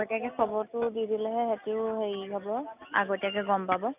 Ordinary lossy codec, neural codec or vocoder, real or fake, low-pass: none; none; real; 3.6 kHz